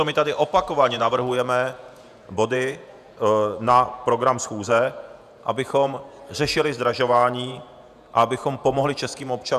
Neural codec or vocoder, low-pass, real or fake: vocoder, 48 kHz, 128 mel bands, Vocos; 14.4 kHz; fake